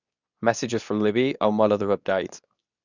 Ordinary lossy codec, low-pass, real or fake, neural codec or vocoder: none; 7.2 kHz; fake; codec, 24 kHz, 0.9 kbps, WavTokenizer, medium speech release version 2